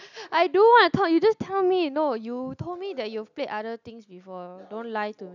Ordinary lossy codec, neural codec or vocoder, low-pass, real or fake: none; none; 7.2 kHz; real